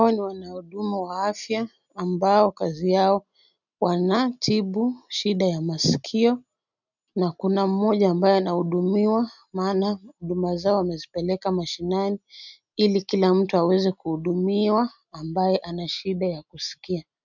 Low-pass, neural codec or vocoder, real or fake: 7.2 kHz; none; real